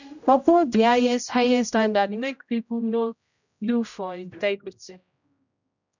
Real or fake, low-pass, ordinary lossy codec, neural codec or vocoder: fake; 7.2 kHz; none; codec, 16 kHz, 0.5 kbps, X-Codec, HuBERT features, trained on general audio